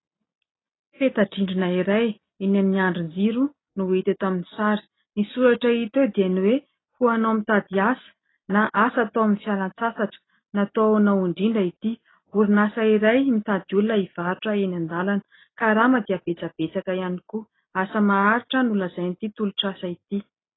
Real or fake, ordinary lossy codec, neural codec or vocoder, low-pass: real; AAC, 16 kbps; none; 7.2 kHz